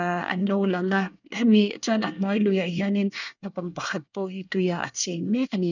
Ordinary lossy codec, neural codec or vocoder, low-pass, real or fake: none; codec, 24 kHz, 1 kbps, SNAC; 7.2 kHz; fake